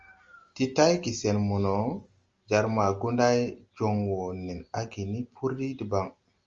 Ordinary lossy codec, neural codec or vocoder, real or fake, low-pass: Opus, 32 kbps; none; real; 7.2 kHz